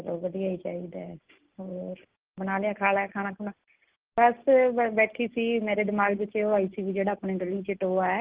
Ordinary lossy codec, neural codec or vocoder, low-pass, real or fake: Opus, 16 kbps; none; 3.6 kHz; real